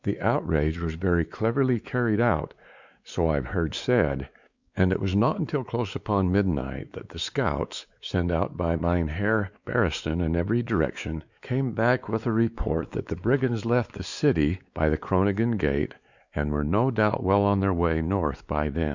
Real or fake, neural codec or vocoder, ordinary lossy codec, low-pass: fake; codec, 16 kHz, 4 kbps, X-Codec, WavLM features, trained on Multilingual LibriSpeech; Opus, 64 kbps; 7.2 kHz